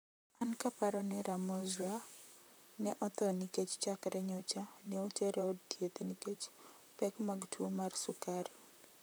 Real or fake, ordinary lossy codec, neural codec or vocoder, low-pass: fake; none; vocoder, 44.1 kHz, 128 mel bands, Pupu-Vocoder; none